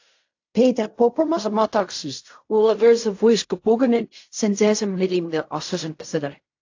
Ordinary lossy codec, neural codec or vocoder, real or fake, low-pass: AAC, 48 kbps; codec, 16 kHz in and 24 kHz out, 0.4 kbps, LongCat-Audio-Codec, fine tuned four codebook decoder; fake; 7.2 kHz